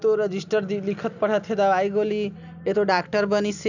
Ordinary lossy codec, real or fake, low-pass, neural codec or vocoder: none; real; 7.2 kHz; none